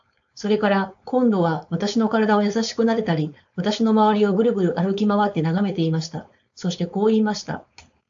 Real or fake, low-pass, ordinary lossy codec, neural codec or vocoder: fake; 7.2 kHz; AAC, 64 kbps; codec, 16 kHz, 4.8 kbps, FACodec